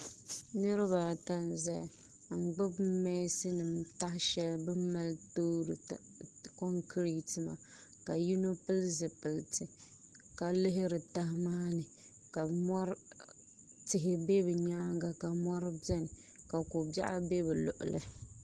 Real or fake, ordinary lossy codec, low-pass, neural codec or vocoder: real; Opus, 16 kbps; 10.8 kHz; none